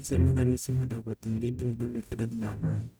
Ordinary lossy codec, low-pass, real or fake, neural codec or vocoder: none; none; fake; codec, 44.1 kHz, 0.9 kbps, DAC